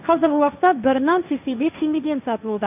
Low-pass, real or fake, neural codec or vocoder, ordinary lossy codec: 3.6 kHz; fake; codec, 16 kHz, 1.1 kbps, Voila-Tokenizer; none